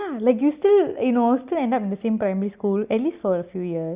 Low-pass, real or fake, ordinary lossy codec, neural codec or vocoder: 3.6 kHz; real; Opus, 64 kbps; none